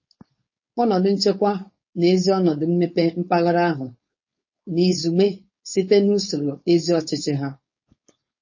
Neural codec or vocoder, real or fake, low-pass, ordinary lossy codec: codec, 16 kHz, 4.8 kbps, FACodec; fake; 7.2 kHz; MP3, 32 kbps